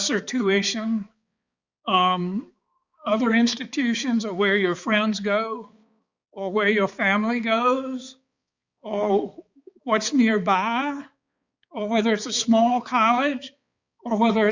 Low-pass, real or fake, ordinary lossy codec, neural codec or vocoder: 7.2 kHz; fake; Opus, 64 kbps; codec, 16 kHz, 4 kbps, X-Codec, HuBERT features, trained on balanced general audio